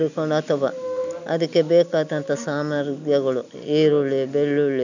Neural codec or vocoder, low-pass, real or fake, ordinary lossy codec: none; 7.2 kHz; real; none